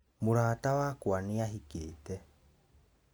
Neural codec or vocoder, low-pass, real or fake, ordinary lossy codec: none; none; real; none